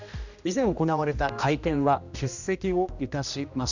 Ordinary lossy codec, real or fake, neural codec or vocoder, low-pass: none; fake; codec, 16 kHz, 1 kbps, X-Codec, HuBERT features, trained on general audio; 7.2 kHz